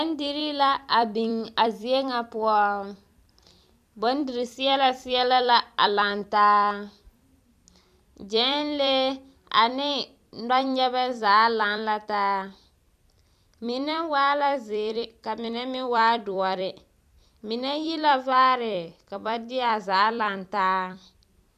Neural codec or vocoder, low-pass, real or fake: vocoder, 44.1 kHz, 128 mel bands every 256 samples, BigVGAN v2; 14.4 kHz; fake